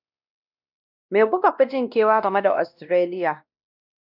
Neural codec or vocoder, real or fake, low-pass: codec, 16 kHz, 1 kbps, X-Codec, WavLM features, trained on Multilingual LibriSpeech; fake; 5.4 kHz